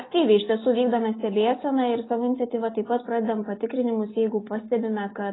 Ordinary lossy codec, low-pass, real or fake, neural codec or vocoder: AAC, 16 kbps; 7.2 kHz; real; none